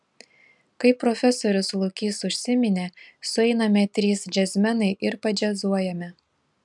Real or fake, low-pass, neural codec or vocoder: real; 10.8 kHz; none